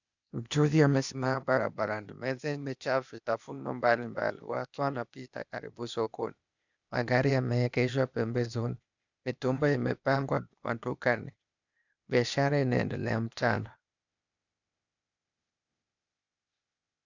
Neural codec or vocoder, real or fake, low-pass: codec, 16 kHz, 0.8 kbps, ZipCodec; fake; 7.2 kHz